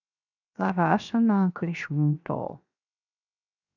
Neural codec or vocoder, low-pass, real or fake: codec, 16 kHz, 0.7 kbps, FocalCodec; 7.2 kHz; fake